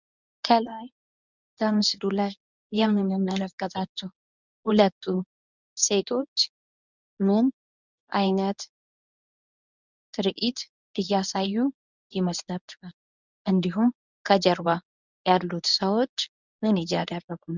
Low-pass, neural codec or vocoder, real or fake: 7.2 kHz; codec, 24 kHz, 0.9 kbps, WavTokenizer, medium speech release version 1; fake